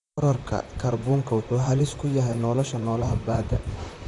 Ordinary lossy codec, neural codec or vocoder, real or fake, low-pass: none; vocoder, 44.1 kHz, 128 mel bands, Pupu-Vocoder; fake; 10.8 kHz